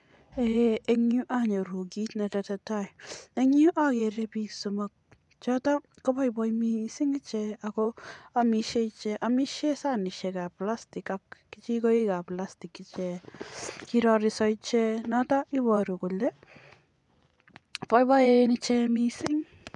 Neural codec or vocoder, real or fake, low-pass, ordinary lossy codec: vocoder, 24 kHz, 100 mel bands, Vocos; fake; 10.8 kHz; none